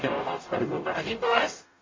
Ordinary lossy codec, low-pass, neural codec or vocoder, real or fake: MP3, 32 kbps; 7.2 kHz; codec, 44.1 kHz, 0.9 kbps, DAC; fake